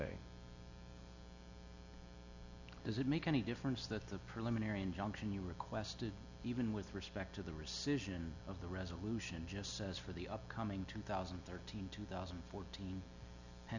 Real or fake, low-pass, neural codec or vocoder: real; 7.2 kHz; none